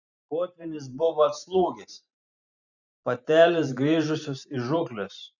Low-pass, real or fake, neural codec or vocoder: 7.2 kHz; fake; vocoder, 44.1 kHz, 128 mel bands every 512 samples, BigVGAN v2